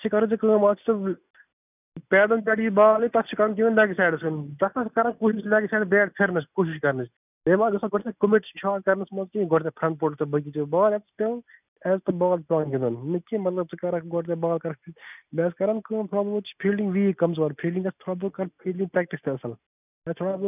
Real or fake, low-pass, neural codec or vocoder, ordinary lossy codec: real; 3.6 kHz; none; none